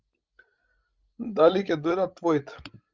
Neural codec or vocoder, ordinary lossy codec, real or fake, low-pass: codec, 16 kHz, 16 kbps, FreqCodec, larger model; Opus, 32 kbps; fake; 7.2 kHz